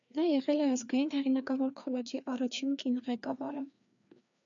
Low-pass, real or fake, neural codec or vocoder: 7.2 kHz; fake; codec, 16 kHz, 2 kbps, FreqCodec, larger model